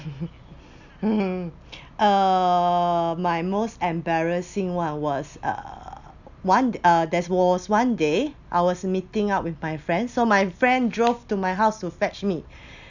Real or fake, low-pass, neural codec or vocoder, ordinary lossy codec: real; 7.2 kHz; none; none